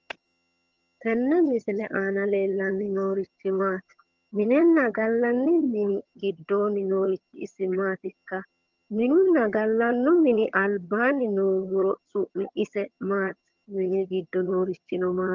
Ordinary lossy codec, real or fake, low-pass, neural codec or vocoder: Opus, 24 kbps; fake; 7.2 kHz; vocoder, 22.05 kHz, 80 mel bands, HiFi-GAN